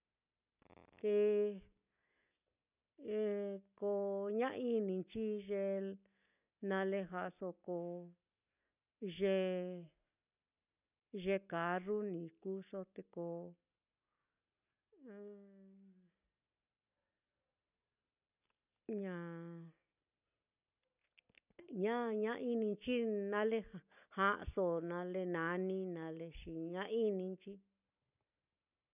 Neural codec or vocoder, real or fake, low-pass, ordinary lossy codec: none; real; 3.6 kHz; none